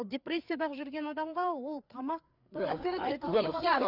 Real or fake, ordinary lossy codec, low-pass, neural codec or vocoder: fake; none; 5.4 kHz; codec, 16 kHz, 4 kbps, FreqCodec, larger model